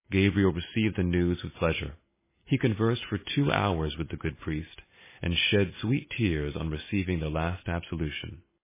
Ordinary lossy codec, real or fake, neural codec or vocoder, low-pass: MP3, 16 kbps; real; none; 3.6 kHz